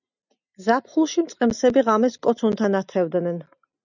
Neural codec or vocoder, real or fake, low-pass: none; real; 7.2 kHz